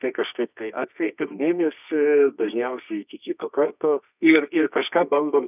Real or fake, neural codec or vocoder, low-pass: fake; codec, 24 kHz, 0.9 kbps, WavTokenizer, medium music audio release; 3.6 kHz